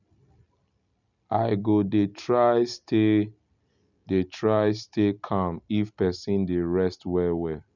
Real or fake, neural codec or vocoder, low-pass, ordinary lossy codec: real; none; 7.2 kHz; none